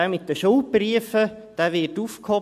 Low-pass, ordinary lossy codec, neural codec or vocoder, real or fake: 14.4 kHz; MP3, 64 kbps; none; real